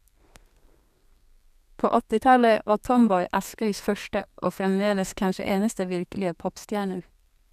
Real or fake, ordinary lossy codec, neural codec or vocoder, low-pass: fake; none; codec, 32 kHz, 1.9 kbps, SNAC; 14.4 kHz